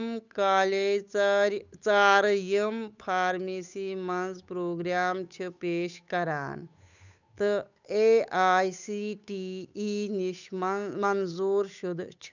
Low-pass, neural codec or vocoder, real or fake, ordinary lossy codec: 7.2 kHz; codec, 16 kHz, 8 kbps, FunCodec, trained on Chinese and English, 25 frames a second; fake; none